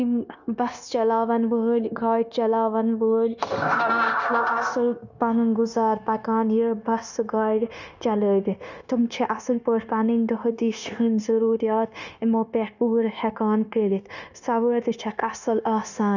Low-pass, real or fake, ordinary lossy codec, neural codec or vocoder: 7.2 kHz; fake; none; codec, 16 kHz, 0.9 kbps, LongCat-Audio-Codec